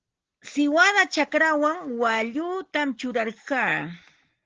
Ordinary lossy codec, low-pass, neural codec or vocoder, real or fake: Opus, 16 kbps; 7.2 kHz; none; real